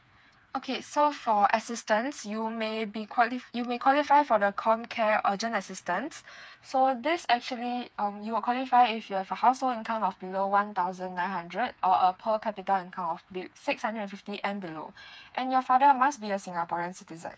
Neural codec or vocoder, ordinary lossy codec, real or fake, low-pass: codec, 16 kHz, 4 kbps, FreqCodec, smaller model; none; fake; none